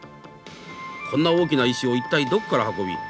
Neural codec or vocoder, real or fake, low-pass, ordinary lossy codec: none; real; none; none